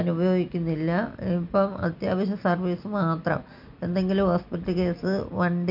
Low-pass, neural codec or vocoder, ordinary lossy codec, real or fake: 5.4 kHz; none; MP3, 48 kbps; real